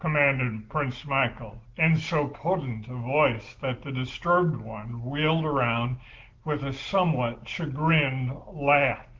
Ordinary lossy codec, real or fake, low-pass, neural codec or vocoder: Opus, 16 kbps; real; 7.2 kHz; none